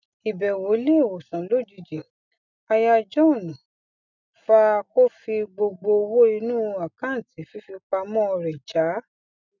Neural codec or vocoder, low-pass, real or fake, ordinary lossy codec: none; 7.2 kHz; real; none